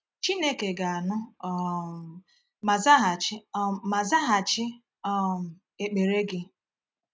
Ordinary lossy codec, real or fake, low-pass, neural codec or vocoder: none; real; none; none